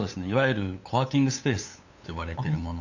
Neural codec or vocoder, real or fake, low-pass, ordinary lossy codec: codec, 16 kHz, 8 kbps, FunCodec, trained on LibriTTS, 25 frames a second; fake; 7.2 kHz; none